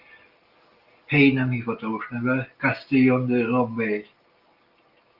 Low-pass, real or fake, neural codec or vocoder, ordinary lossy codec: 5.4 kHz; real; none; Opus, 24 kbps